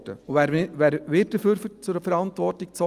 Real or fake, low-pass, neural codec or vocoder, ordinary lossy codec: real; 14.4 kHz; none; Opus, 32 kbps